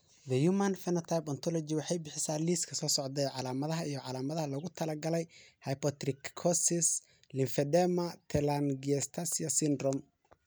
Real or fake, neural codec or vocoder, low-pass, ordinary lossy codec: real; none; none; none